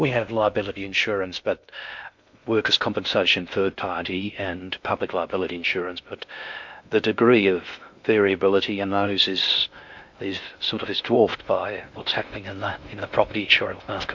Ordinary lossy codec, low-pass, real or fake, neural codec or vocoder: MP3, 64 kbps; 7.2 kHz; fake; codec, 16 kHz in and 24 kHz out, 0.6 kbps, FocalCodec, streaming, 2048 codes